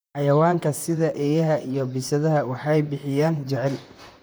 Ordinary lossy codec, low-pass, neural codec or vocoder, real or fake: none; none; vocoder, 44.1 kHz, 128 mel bands, Pupu-Vocoder; fake